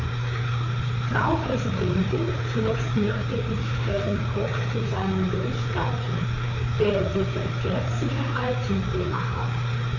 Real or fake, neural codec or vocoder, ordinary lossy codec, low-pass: fake; codec, 16 kHz, 4 kbps, FreqCodec, larger model; none; 7.2 kHz